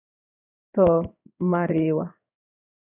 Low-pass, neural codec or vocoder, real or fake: 3.6 kHz; codec, 16 kHz in and 24 kHz out, 1 kbps, XY-Tokenizer; fake